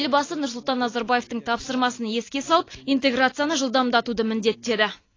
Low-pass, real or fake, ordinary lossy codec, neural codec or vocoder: 7.2 kHz; real; AAC, 32 kbps; none